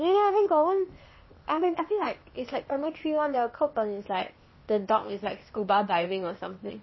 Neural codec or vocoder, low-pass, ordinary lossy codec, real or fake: codec, 16 kHz, 0.8 kbps, ZipCodec; 7.2 kHz; MP3, 24 kbps; fake